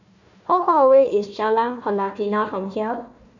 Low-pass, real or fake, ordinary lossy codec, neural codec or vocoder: 7.2 kHz; fake; none; codec, 16 kHz, 1 kbps, FunCodec, trained on Chinese and English, 50 frames a second